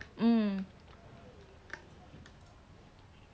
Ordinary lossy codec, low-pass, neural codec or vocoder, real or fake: none; none; none; real